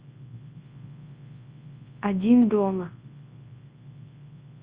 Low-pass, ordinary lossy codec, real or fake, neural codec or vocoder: 3.6 kHz; Opus, 32 kbps; fake; codec, 24 kHz, 0.9 kbps, WavTokenizer, large speech release